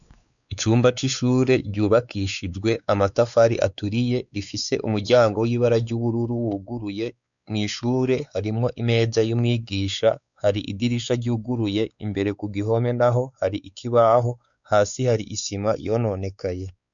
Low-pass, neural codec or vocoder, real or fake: 7.2 kHz; codec, 16 kHz, 4 kbps, X-Codec, WavLM features, trained on Multilingual LibriSpeech; fake